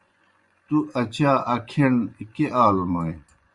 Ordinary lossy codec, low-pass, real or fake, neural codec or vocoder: Opus, 64 kbps; 10.8 kHz; fake; vocoder, 24 kHz, 100 mel bands, Vocos